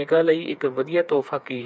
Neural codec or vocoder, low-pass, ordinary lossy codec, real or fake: codec, 16 kHz, 4 kbps, FreqCodec, smaller model; none; none; fake